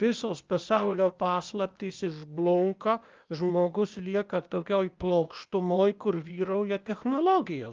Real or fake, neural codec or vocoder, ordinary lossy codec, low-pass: fake; codec, 16 kHz, 0.8 kbps, ZipCodec; Opus, 24 kbps; 7.2 kHz